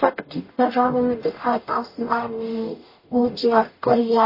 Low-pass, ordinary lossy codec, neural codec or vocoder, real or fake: 5.4 kHz; MP3, 24 kbps; codec, 44.1 kHz, 0.9 kbps, DAC; fake